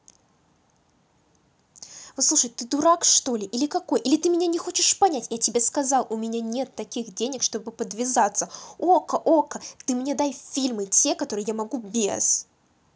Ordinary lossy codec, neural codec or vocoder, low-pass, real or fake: none; none; none; real